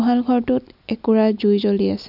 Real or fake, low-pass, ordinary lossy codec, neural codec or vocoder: real; 5.4 kHz; none; none